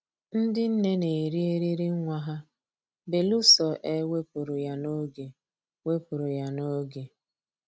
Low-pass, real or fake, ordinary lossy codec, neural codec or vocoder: none; real; none; none